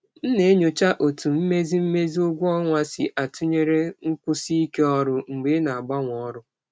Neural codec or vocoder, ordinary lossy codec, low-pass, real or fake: none; none; none; real